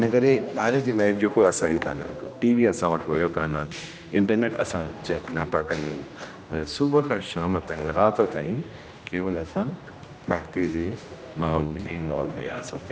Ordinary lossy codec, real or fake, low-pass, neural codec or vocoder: none; fake; none; codec, 16 kHz, 1 kbps, X-Codec, HuBERT features, trained on general audio